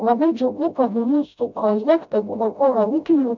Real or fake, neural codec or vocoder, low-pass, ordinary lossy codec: fake; codec, 16 kHz, 0.5 kbps, FreqCodec, smaller model; 7.2 kHz; MP3, 64 kbps